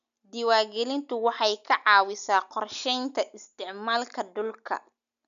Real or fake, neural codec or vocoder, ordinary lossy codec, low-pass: real; none; none; 7.2 kHz